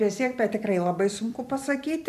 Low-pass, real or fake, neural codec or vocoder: 14.4 kHz; fake; vocoder, 44.1 kHz, 128 mel bands every 256 samples, BigVGAN v2